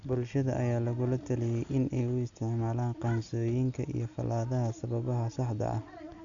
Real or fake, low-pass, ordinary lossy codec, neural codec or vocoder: real; 7.2 kHz; none; none